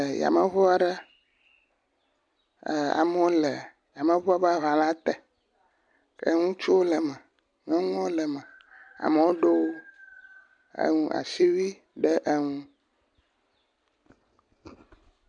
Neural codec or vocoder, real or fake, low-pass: none; real; 9.9 kHz